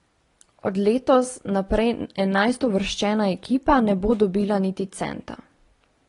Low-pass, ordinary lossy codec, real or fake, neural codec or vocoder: 10.8 kHz; AAC, 32 kbps; real; none